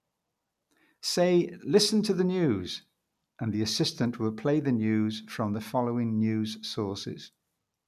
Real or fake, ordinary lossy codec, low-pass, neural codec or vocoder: real; none; 14.4 kHz; none